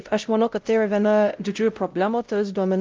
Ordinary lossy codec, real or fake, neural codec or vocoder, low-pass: Opus, 24 kbps; fake; codec, 16 kHz, 0.5 kbps, X-Codec, WavLM features, trained on Multilingual LibriSpeech; 7.2 kHz